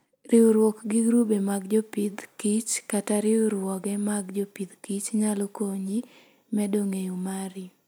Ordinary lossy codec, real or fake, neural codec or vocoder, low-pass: none; real; none; none